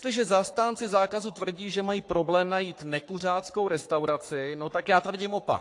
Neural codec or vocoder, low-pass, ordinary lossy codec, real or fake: codec, 44.1 kHz, 3.4 kbps, Pupu-Codec; 10.8 kHz; AAC, 48 kbps; fake